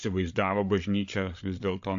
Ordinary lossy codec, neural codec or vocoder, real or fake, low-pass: AAC, 64 kbps; codec, 16 kHz, 8 kbps, FreqCodec, larger model; fake; 7.2 kHz